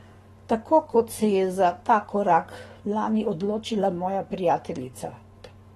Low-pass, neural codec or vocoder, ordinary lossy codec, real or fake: 19.8 kHz; codec, 44.1 kHz, 7.8 kbps, Pupu-Codec; AAC, 32 kbps; fake